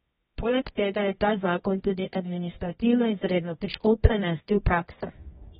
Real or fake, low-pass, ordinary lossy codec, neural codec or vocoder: fake; 10.8 kHz; AAC, 16 kbps; codec, 24 kHz, 0.9 kbps, WavTokenizer, medium music audio release